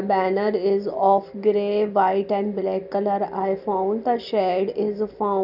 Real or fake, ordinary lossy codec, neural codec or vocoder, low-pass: real; none; none; 5.4 kHz